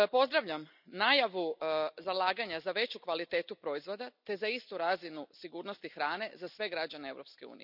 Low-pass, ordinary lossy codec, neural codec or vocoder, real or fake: 5.4 kHz; none; none; real